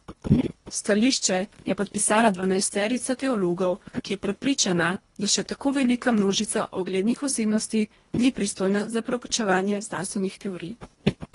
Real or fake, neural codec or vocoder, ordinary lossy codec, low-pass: fake; codec, 24 kHz, 1.5 kbps, HILCodec; AAC, 32 kbps; 10.8 kHz